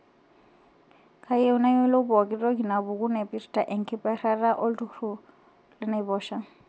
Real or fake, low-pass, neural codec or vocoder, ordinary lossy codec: real; none; none; none